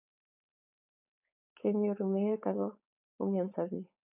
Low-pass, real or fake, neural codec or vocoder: 3.6 kHz; fake; codec, 16 kHz, 4.8 kbps, FACodec